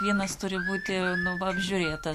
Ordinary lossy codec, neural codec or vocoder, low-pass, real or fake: MP3, 64 kbps; none; 14.4 kHz; real